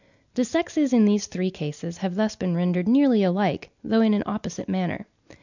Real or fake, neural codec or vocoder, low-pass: real; none; 7.2 kHz